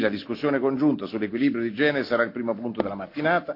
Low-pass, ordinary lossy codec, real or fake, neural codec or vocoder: 5.4 kHz; AAC, 32 kbps; real; none